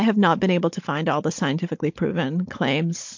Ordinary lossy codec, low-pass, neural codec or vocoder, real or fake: MP3, 48 kbps; 7.2 kHz; codec, 16 kHz, 16 kbps, FunCodec, trained on Chinese and English, 50 frames a second; fake